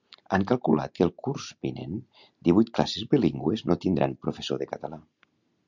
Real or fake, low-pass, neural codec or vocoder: real; 7.2 kHz; none